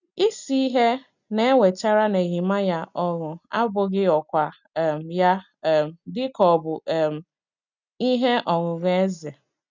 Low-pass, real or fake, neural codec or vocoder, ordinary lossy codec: 7.2 kHz; real; none; none